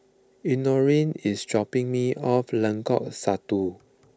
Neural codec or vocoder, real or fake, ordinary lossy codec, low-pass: none; real; none; none